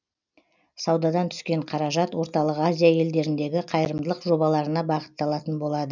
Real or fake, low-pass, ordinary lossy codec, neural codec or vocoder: real; 7.2 kHz; none; none